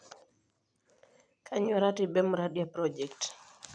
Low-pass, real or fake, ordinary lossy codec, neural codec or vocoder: 9.9 kHz; real; none; none